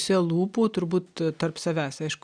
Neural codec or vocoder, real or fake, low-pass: none; real; 9.9 kHz